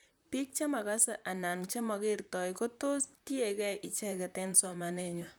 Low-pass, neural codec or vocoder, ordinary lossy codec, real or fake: none; vocoder, 44.1 kHz, 128 mel bands, Pupu-Vocoder; none; fake